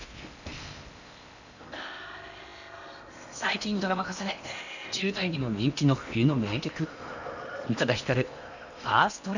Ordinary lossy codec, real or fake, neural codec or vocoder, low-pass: none; fake; codec, 16 kHz in and 24 kHz out, 0.8 kbps, FocalCodec, streaming, 65536 codes; 7.2 kHz